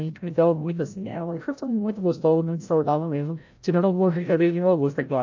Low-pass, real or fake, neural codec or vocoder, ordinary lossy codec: 7.2 kHz; fake; codec, 16 kHz, 0.5 kbps, FreqCodec, larger model; AAC, 48 kbps